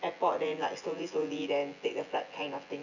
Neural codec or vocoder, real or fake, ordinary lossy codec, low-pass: vocoder, 24 kHz, 100 mel bands, Vocos; fake; none; 7.2 kHz